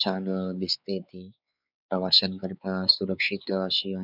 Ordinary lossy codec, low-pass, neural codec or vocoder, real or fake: none; 5.4 kHz; codec, 16 kHz, 4 kbps, X-Codec, WavLM features, trained on Multilingual LibriSpeech; fake